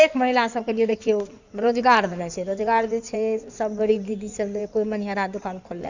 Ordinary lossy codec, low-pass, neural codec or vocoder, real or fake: none; 7.2 kHz; codec, 16 kHz in and 24 kHz out, 2.2 kbps, FireRedTTS-2 codec; fake